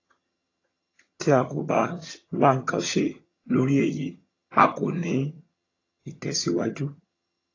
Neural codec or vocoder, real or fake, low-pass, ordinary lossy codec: vocoder, 22.05 kHz, 80 mel bands, HiFi-GAN; fake; 7.2 kHz; AAC, 32 kbps